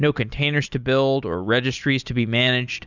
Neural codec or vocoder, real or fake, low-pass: none; real; 7.2 kHz